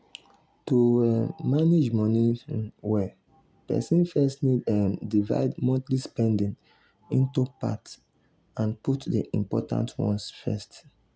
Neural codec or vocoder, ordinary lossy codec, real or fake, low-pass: none; none; real; none